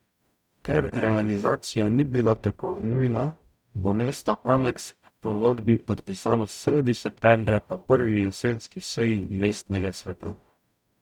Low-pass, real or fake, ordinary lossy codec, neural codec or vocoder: 19.8 kHz; fake; none; codec, 44.1 kHz, 0.9 kbps, DAC